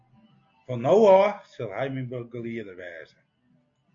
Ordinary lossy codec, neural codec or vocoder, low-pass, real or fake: AAC, 48 kbps; none; 7.2 kHz; real